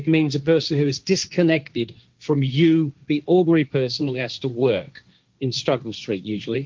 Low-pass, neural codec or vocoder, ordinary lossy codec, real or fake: 7.2 kHz; codec, 16 kHz, 1.1 kbps, Voila-Tokenizer; Opus, 24 kbps; fake